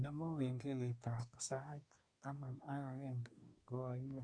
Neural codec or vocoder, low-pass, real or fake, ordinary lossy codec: codec, 24 kHz, 1 kbps, SNAC; 9.9 kHz; fake; none